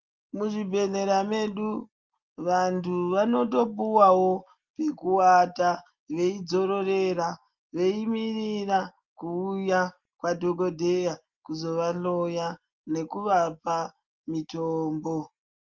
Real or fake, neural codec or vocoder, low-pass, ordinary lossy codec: real; none; 7.2 kHz; Opus, 32 kbps